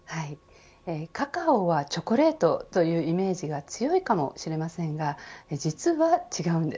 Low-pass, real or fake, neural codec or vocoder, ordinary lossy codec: none; real; none; none